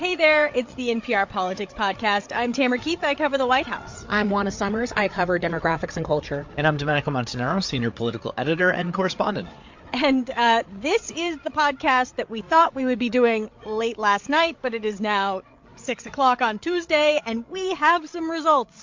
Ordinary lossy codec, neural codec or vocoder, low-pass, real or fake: MP3, 48 kbps; codec, 16 kHz, 8 kbps, FreqCodec, larger model; 7.2 kHz; fake